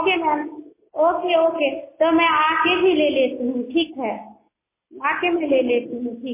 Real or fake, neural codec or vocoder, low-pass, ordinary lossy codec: real; none; 3.6 kHz; MP3, 16 kbps